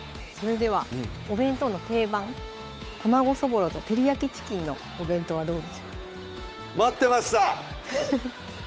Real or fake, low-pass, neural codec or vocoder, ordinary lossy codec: fake; none; codec, 16 kHz, 8 kbps, FunCodec, trained on Chinese and English, 25 frames a second; none